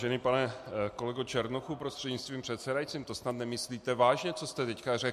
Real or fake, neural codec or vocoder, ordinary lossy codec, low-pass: real; none; MP3, 64 kbps; 14.4 kHz